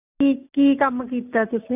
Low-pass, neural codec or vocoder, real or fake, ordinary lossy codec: 3.6 kHz; none; real; none